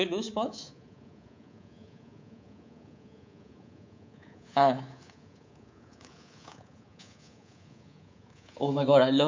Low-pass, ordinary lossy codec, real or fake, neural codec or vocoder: 7.2 kHz; MP3, 48 kbps; fake; codec, 24 kHz, 3.1 kbps, DualCodec